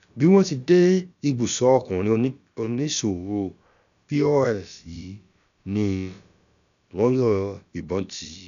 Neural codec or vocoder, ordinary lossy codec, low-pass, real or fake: codec, 16 kHz, about 1 kbps, DyCAST, with the encoder's durations; none; 7.2 kHz; fake